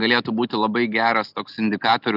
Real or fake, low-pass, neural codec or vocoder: real; 5.4 kHz; none